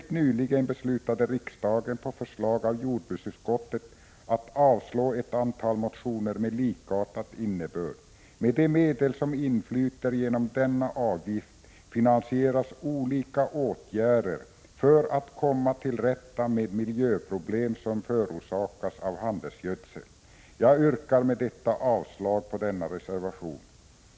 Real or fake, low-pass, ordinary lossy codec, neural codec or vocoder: real; none; none; none